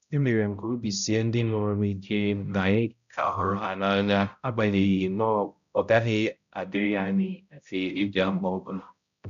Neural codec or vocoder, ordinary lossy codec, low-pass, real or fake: codec, 16 kHz, 0.5 kbps, X-Codec, HuBERT features, trained on balanced general audio; none; 7.2 kHz; fake